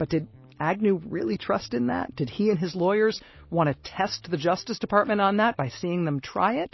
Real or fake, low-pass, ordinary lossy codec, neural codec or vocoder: real; 7.2 kHz; MP3, 24 kbps; none